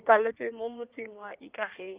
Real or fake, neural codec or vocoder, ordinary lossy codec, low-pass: fake; codec, 16 kHz in and 24 kHz out, 1.1 kbps, FireRedTTS-2 codec; Opus, 24 kbps; 3.6 kHz